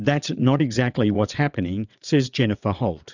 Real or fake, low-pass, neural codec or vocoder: fake; 7.2 kHz; vocoder, 22.05 kHz, 80 mel bands, WaveNeXt